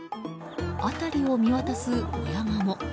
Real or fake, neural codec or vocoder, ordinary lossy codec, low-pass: real; none; none; none